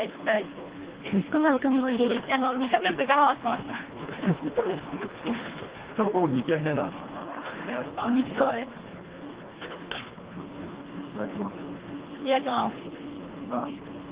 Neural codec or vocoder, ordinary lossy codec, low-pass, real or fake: codec, 24 kHz, 1.5 kbps, HILCodec; Opus, 16 kbps; 3.6 kHz; fake